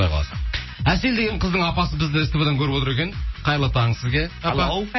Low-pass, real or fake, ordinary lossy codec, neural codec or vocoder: 7.2 kHz; real; MP3, 24 kbps; none